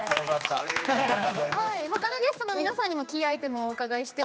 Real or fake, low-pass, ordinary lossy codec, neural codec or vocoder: fake; none; none; codec, 16 kHz, 2 kbps, X-Codec, HuBERT features, trained on general audio